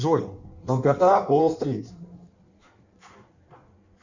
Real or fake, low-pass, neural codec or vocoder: fake; 7.2 kHz; codec, 16 kHz in and 24 kHz out, 1.1 kbps, FireRedTTS-2 codec